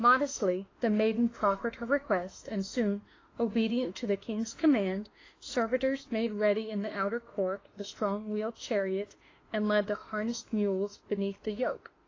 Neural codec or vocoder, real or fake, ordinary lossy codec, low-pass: autoencoder, 48 kHz, 32 numbers a frame, DAC-VAE, trained on Japanese speech; fake; AAC, 32 kbps; 7.2 kHz